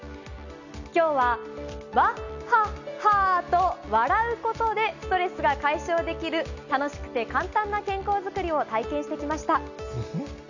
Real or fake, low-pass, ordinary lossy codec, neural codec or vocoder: real; 7.2 kHz; none; none